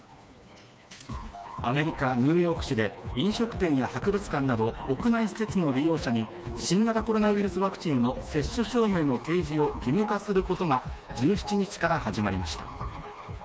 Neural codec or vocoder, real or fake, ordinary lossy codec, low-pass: codec, 16 kHz, 2 kbps, FreqCodec, smaller model; fake; none; none